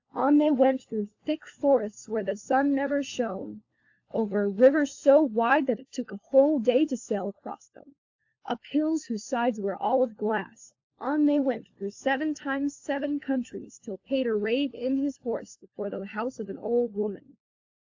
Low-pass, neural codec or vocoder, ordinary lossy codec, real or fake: 7.2 kHz; codec, 16 kHz, 4 kbps, FunCodec, trained on LibriTTS, 50 frames a second; AAC, 48 kbps; fake